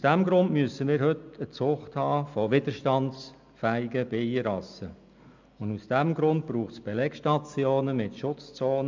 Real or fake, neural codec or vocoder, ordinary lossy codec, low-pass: real; none; MP3, 64 kbps; 7.2 kHz